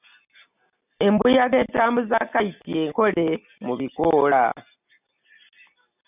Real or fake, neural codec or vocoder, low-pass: real; none; 3.6 kHz